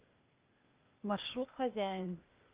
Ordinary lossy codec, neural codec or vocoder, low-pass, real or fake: Opus, 24 kbps; codec, 16 kHz, 0.8 kbps, ZipCodec; 3.6 kHz; fake